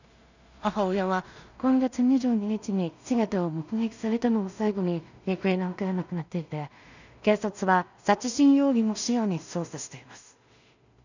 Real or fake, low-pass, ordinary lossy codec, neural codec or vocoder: fake; 7.2 kHz; none; codec, 16 kHz in and 24 kHz out, 0.4 kbps, LongCat-Audio-Codec, two codebook decoder